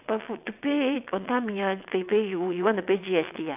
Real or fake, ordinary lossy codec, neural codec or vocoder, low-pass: fake; none; vocoder, 22.05 kHz, 80 mel bands, WaveNeXt; 3.6 kHz